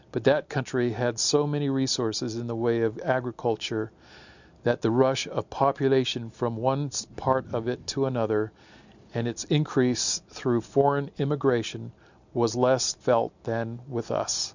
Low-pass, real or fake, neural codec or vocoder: 7.2 kHz; real; none